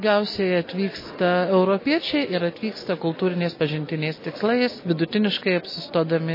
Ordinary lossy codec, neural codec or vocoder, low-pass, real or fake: MP3, 24 kbps; none; 5.4 kHz; real